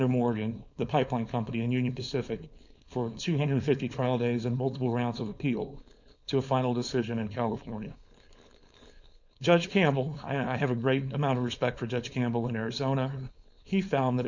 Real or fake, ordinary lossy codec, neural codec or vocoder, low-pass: fake; Opus, 64 kbps; codec, 16 kHz, 4.8 kbps, FACodec; 7.2 kHz